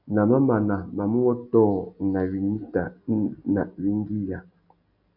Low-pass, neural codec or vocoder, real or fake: 5.4 kHz; none; real